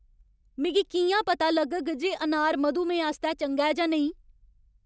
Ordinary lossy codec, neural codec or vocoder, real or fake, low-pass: none; none; real; none